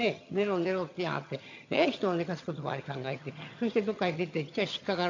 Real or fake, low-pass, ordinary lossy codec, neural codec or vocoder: fake; 7.2 kHz; AAC, 48 kbps; vocoder, 22.05 kHz, 80 mel bands, HiFi-GAN